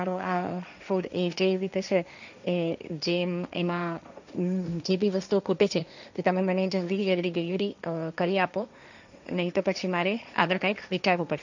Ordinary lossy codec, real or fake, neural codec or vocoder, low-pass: none; fake; codec, 16 kHz, 1.1 kbps, Voila-Tokenizer; 7.2 kHz